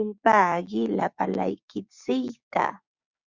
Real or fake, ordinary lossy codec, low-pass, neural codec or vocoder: fake; Opus, 64 kbps; 7.2 kHz; codec, 16 kHz, 6 kbps, DAC